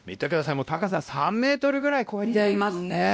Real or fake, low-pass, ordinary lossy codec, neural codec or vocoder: fake; none; none; codec, 16 kHz, 1 kbps, X-Codec, WavLM features, trained on Multilingual LibriSpeech